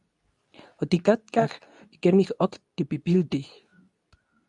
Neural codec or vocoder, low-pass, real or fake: codec, 24 kHz, 0.9 kbps, WavTokenizer, medium speech release version 2; 10.8 kHz; fake